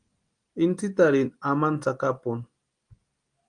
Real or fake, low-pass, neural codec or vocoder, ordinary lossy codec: real; 9.9 kHz; none; Opus, 32 kbps